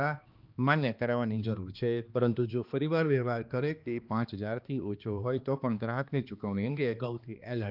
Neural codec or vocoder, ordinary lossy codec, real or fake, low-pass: codec, 16 kHz, 2 kbps, X-Codec, HuBERT features, trained on balanced general audio; Opus, 24 kbps; fake; 5.4 kHz